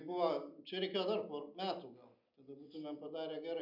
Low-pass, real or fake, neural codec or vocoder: 5.4 kHz; real; none